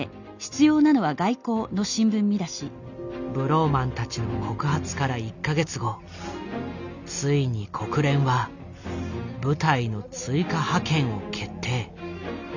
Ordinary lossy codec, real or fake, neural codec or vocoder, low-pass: none; real; none; 7.2 kHz